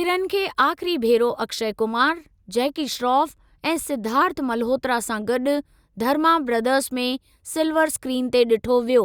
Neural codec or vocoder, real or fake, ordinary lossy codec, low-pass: none; real; Opus, 64 kbps; 19.8 kHz